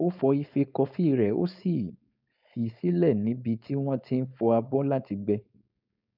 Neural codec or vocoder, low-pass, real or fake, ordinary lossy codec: codec, 16 kHz, 4.8 kbps, FACodec; 5.4 kHz; fake; none